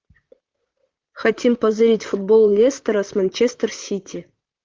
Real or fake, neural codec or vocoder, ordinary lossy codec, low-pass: fake; vocoder, 44.1 kHz, 128 mel bands, Pupu-Vocoder; Opus, 32 kbps; 7.2 kHz